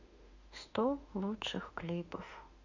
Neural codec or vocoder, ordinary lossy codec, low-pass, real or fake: autoencoder, 48 kHz, 32 numbers a frame, DAC-VAE, trained on Japanese speech; Opus, 64 kbps; 7.2 kHz; fake